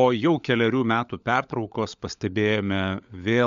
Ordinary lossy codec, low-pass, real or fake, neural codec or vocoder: MP3, 64 kbps; 7.2 kHz; fake; codec, 16 kHz, 16 kbps, FunCodec, trained on LibriTTS, 50 frames a second